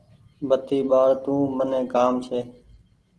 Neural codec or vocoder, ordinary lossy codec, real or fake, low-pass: none; Opus, 16 kbps; real; 10.8 kHz